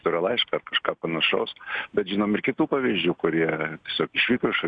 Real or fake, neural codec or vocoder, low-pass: real; none; 9.9 kHz